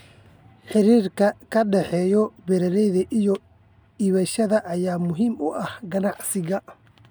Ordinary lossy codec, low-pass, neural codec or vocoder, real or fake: none; none; none; real